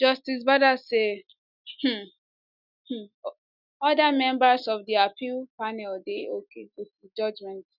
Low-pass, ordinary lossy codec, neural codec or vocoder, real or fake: 5.4 kHz; none; none; real